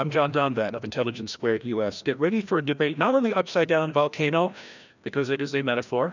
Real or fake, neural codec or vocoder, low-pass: fake; codec, 16 kHz, 1 kbps, FreqCodec, larger model; 7.2 kHz